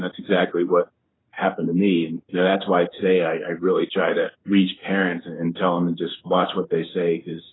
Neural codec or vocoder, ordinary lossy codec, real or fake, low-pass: codec, 16 kHz in and 24 kHz out, 1 kbps, XY-Tokenizer; AAC, 16 kbps; fake; 7.2 kHz